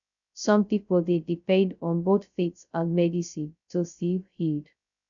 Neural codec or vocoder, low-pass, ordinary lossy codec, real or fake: codec, 16 kHz, 0.2 kbps, FocalCodec; 7.2 kHz; none; fake